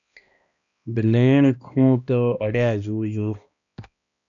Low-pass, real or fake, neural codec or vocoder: 7.2 kHz; fake; codec, 16 kHz, 1 kbps, X-Codec, HuBERT features, trained on balanced general audio